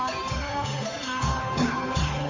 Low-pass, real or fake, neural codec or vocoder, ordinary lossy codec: 7.2 kHz; fake; codec, 16 kHz, 8 kbps, FunCodec, trained on Chinese and English, 25 frames a second; AAC, 32 kbps